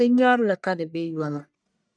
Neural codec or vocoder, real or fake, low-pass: codec, 44.1 kHz, 1.7 kbps, Pupu-Codec; fake; 9.9 kHz